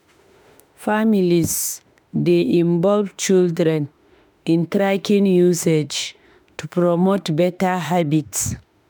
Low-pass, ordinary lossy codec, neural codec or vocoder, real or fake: none; none; autoencoder, 48 kHz, 32 numbers a frame, DAC-VAE, trained on Japanese speech; fake